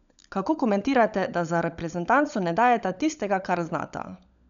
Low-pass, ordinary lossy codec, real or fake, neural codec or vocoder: 7.2 kHz; none; fake; codec, 16 kHz, 16 kbps, FunCodec, trained on LibriTTS, 50 frames a second